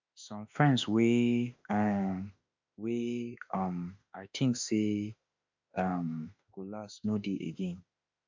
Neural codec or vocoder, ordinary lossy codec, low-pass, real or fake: autoencoder, 48 kHz, 32 numbers a frame, DAC-VAE, trained on Japanese speech; MP3, 64 kbps; 7.2 kHz; fake